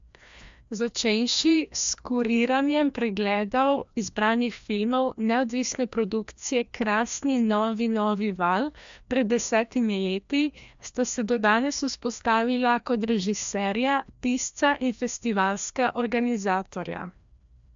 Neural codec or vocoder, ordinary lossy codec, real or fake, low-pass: codec, 16 kHz, 1 kbps, FreqCodec, larger model; MP3, 64 kbps; fake; 7.2 kHz